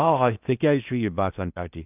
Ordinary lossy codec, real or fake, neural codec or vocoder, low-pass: none; fake; codec, 16 kHz in and 24 kHz out, 0.6 kbps, FocalCodec, streaming, 4096 codes; 3.6 kHz